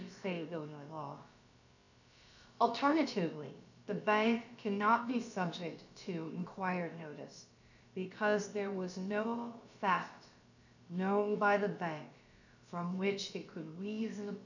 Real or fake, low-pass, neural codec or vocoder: fake; 7.2 kHz; codec, 16 kHz, about 1 kbps, DyCAST, with the encoder's durations